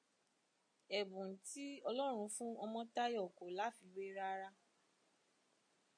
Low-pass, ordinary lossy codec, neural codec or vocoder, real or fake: 9.9 kHz; MP3, 48 kbps; none; real